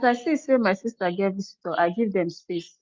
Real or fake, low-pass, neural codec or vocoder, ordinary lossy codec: real; 7.2 kHz; none; Opus, 24 kbps